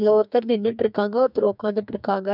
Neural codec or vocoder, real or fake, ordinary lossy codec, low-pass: codec, 44.1 kHz, 2.6 kbps, SNAC; fake; none; 5.4 kHz